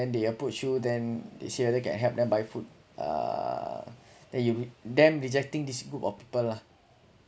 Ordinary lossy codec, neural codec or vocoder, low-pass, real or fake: none; none; none; real